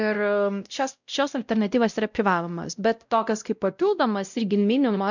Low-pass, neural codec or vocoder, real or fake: 7.2 kHz; codec, 16 kHz, 0.5 kbps, X-Codec, WavLM features, trained on Multilingual LibriSpeech; fake